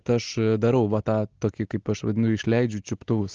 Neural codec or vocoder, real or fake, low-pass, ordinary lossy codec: none; real; 7.2 kHz; Opus, 16 kbps